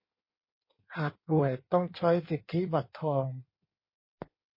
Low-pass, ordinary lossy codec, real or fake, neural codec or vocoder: 5.4 kHz; MP3, 24 kbps; fake; codec, 16 kHz in and 24 kHz out, 1.1 kbps, FireRedTTS-2 codec